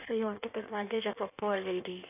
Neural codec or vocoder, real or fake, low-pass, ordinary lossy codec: codec, 16 kHz in and 24 kHz out, 1.1 kbps, FireRedTTS-2 codec; fake; 3.6 kHz; none